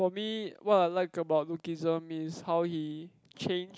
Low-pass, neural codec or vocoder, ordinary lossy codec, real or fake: none; none; none; real